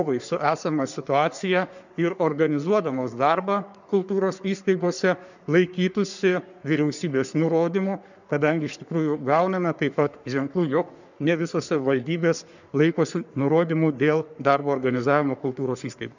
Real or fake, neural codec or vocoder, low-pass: fake; codec, 44.1 kHz, 3.4 kbps, Pupu-Codec; 7.2 kHz